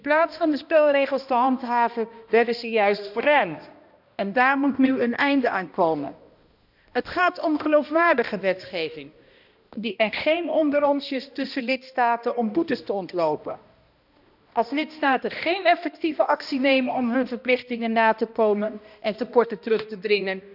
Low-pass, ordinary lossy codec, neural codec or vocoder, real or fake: 5.4 kHz; none; codec, 16 kHz, 1 kbps, X-Codec, HuBERT features, trained on balanced general audio; fake